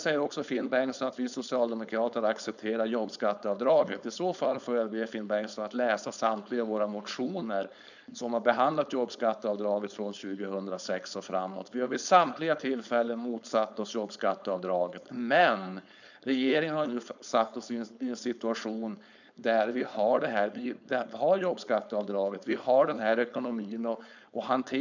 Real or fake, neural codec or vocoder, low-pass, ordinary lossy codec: fake; codec, 16 kHz, 4.8 kbps, FACodec; 7.2 kHz; none